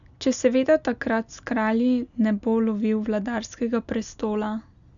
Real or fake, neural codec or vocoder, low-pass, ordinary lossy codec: real; none; 7.2 kHz; none